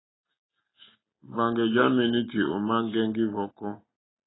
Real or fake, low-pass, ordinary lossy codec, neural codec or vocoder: real; 7.2 kHz; AAC, 16 kbps; none